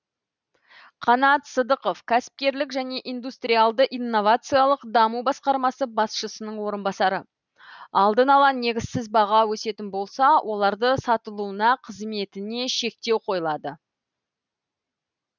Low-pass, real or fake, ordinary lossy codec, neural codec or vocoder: 7.2 kHz; real; none; none